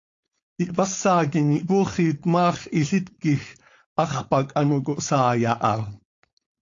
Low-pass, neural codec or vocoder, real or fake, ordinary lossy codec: 7.2 kHz; codec, 16 kHz, 4.8 kbps, FACodec; fake; AAC, 48 kbps